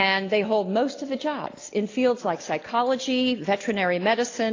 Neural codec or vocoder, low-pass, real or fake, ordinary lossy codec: none; 7.2 kHz; real; AAC, 32 kbps